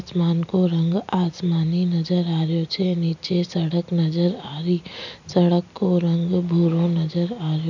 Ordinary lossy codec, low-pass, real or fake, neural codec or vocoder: none; 7.2 kHz; real; none